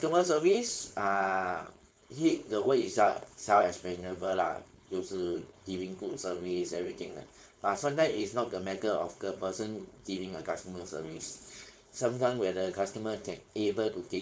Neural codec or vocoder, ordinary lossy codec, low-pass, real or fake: codec, 16 kHz, 4.8 kbps, FACodec; none; none; fake